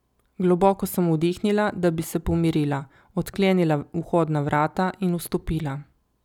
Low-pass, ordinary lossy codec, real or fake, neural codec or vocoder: 19.8 kHz; none; real; none